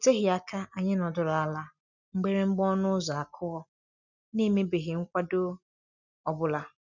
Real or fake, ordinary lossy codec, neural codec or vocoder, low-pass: real; none; none; 7.2 kHz